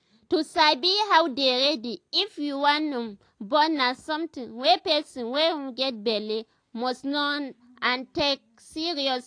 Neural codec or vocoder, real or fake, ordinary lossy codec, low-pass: none; real; AAC, 48 kbps; 9.9 kHz